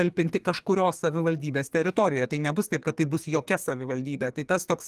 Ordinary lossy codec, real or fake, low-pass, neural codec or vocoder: Opus, 24 kbps; fake; 14.4 kHz; codec, 44.1 kHz, 2.6 kbps, SNAC